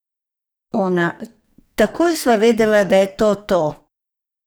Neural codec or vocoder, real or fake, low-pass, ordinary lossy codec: codec, 44.1 kHz, 2.6 kbps, SNAC; fake; none; none